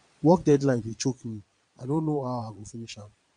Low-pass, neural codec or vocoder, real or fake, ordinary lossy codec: 9.9 kHz; vocoder, 22.05 kHz, 80 mel bands, Vocos; fake; MP3, 64 kbps